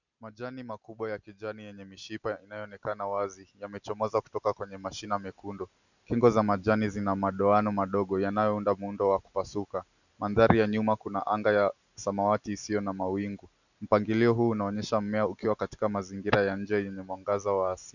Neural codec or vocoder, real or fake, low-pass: none; real; 7.2 kHz